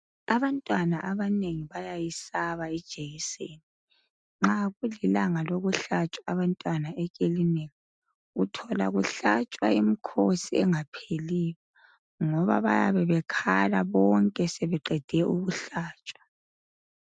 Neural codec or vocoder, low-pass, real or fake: none; 9.9 kHz; real